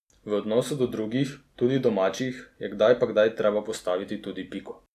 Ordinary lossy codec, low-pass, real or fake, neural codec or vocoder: none; 14.4 kHz; real; none